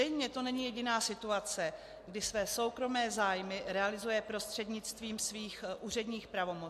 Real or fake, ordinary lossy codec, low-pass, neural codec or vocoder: real; MP3, 64 kbps; 14.4 kHz; none